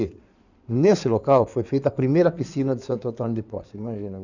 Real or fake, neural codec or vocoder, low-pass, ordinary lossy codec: fake; codec, 16 kHz in and 24 kHz out, 2.2 kbps, FireRedTTS-2 codec; 7.2 kHz; none